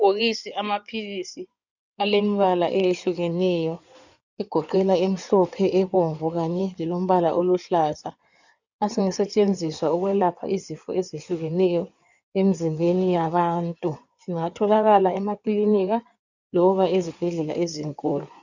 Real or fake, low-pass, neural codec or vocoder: fake; 7.2 kHz; codec, 16 kHz in and 24 kHz out, 2.2 kbps, FireRedTTS-2 codec